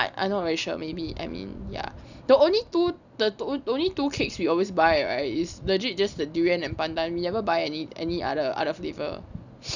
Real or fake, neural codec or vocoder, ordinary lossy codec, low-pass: real; none; none; 7.2 kHz